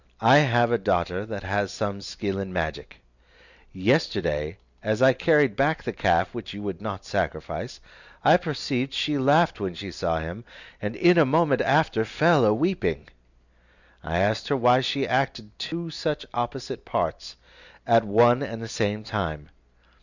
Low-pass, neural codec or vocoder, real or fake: 7.2 kHz; none; real